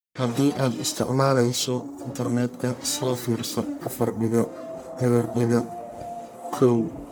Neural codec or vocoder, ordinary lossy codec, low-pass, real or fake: codec, 44.1 kHz, 1.7 kbps, Pupu-Codec; none; none; fake